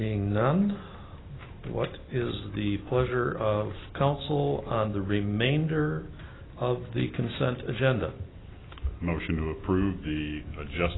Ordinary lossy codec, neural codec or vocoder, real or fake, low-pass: AAC, 16 kbps; none; real; 7.2 kHz